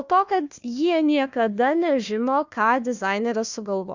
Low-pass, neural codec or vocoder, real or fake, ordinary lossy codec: 7.2 kHz; codec, 16 kHz, 1 kbps, FunCodec, trained on Chinese and English, 50 frames a second; fake; Opus, 64 kbps